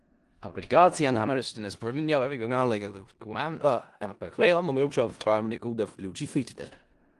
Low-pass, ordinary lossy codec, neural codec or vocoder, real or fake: 10.8 kHz; Opus, 24 kbps; codec, 16 kHz in and 24 kHz out, 0.4 kbps, LongCat-Audio-Codec, four codebook decoder; fake